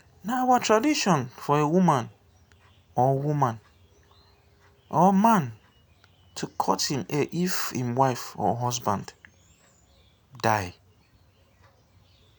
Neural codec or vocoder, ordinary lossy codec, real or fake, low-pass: none; none; real; none